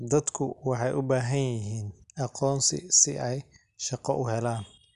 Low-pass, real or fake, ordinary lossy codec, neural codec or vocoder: 10.8 kHz; real; Opus, 64 kbps; none